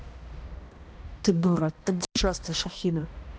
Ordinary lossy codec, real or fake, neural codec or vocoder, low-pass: none; fake; codec, 16 kHz, 1 kbps, X-Codec, HuBERT features, trained on balanced general audio; none